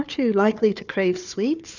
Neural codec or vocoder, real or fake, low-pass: codec, 16 kHz, 4 kbps, FunCodec, trained on Chinese and English, 50 frames a second; fake; 7.2 kHz